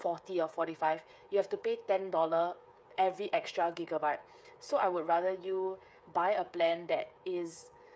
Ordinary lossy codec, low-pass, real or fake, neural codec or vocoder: none; none; fake; codec, 16 kHz, 8 kbps, FreqCodec, smaller model